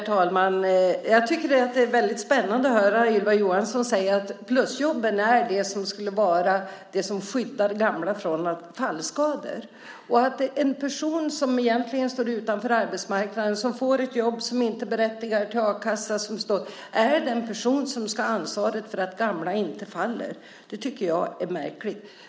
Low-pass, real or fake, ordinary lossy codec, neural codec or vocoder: none; real; none; none